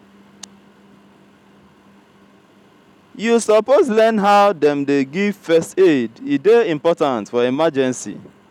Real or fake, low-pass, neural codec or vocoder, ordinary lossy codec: real; 19.8 kHz; none; none